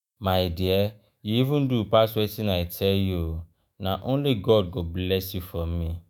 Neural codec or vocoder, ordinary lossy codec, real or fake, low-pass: autoencoder, 48 kHz, 128 numbers a frame, DAC-VAE, trained on Japanese speech; none; fake; none